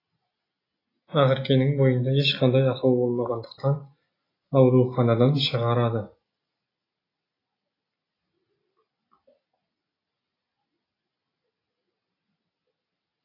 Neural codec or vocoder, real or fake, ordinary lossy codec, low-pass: none; real; AAC, 24 kbps; 5.4 kHz